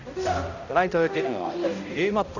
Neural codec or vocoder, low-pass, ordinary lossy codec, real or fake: codec, 16 kHz, 0.5 kbps, X-Codec, HuBERT features, trained on balanced general audio; 7.2 kHz; none; fake